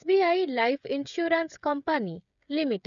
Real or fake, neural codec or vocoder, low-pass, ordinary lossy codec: fake; codec, 16 kHz, 8 kbps, FreqCodec, smaller model; 7.2 kHz; none